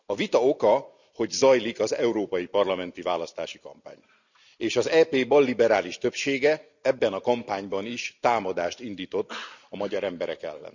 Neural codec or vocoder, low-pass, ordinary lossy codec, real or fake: none; 7.2 kHz; none; real